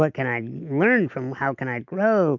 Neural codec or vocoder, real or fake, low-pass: none; real; 7.2 kHz